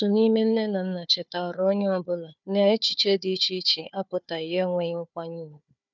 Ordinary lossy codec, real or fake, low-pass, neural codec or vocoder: none; fake; 7.2 kHz; codec, 16 kHz, 4 kbps, FunCodec, trained on Chinese and English, 50 frames a second